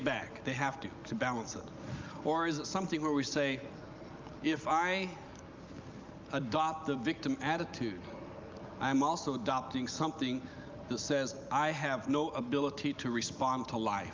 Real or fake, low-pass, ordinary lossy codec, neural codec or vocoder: real; 7.2 kHz; Opus, 24 kbps; none